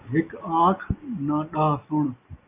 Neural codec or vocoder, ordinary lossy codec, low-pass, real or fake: none; AAC, 24 kbps; 3.6 kHz; real